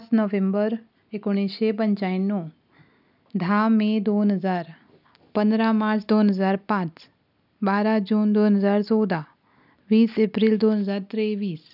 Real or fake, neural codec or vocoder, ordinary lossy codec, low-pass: real; none; none; 5.4 kHz